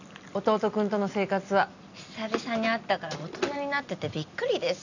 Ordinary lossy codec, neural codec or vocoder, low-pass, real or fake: none; none; 7.2 kHz; real